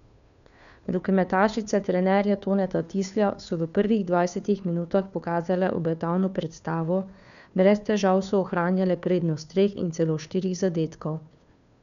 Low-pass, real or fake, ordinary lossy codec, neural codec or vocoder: 7.2 kHz; fake; none; codec, 16 kHz, 2 kbps, FunCodec, trained on Chinese and English, 25 frames a second